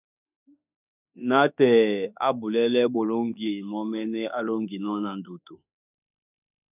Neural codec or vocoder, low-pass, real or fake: autoencoder, 48 kHz, 32 numbers a frame, DAC-VAE, trained on Japanese speech; 3.6 kHz; fake